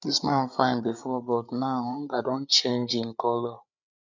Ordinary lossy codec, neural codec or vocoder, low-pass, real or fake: none; codec, 16 kHz, 8 kbps, FreqCodec, larger model; 7.2 kHz; fake